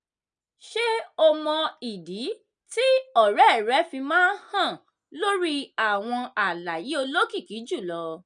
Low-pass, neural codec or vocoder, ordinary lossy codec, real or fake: 9.9 kHz; none; none; real